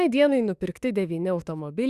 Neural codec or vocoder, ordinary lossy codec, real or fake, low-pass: autoencoder, 48 kHz, 32 numbers a frame, DAC-VAE, trained on Japanese speech; Opus, 64 kbps; fake; 14.4 kHz